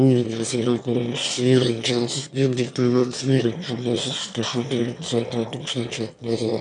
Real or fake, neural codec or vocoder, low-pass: fake; autoencoder, 22.05 kHz, a latent of 192 numbers a frame, VITS, trained on one speaker; 9.9 kHz